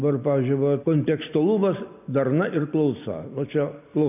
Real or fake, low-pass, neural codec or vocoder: real; 3.6 kHz; none